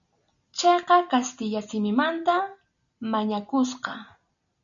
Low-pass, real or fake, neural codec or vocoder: 7.2 kHz; real; none